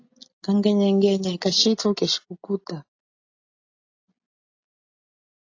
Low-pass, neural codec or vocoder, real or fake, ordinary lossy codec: 7.2 kHz; none; real; AAC, 48 kbps